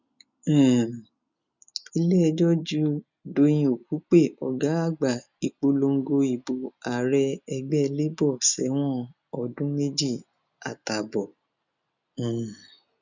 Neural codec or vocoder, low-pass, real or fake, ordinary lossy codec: none; 7.2 kHz; real; none